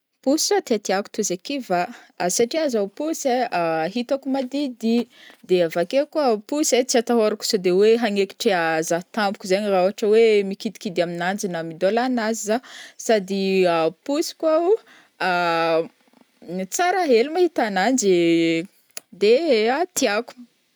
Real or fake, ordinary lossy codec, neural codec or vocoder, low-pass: real; none; none; none